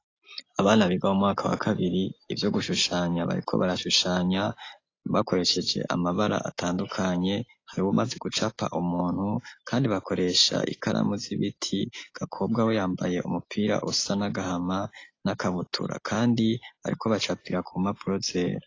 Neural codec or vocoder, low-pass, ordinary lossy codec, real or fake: none; 7.2 kHz; AAC, 32 kbps; real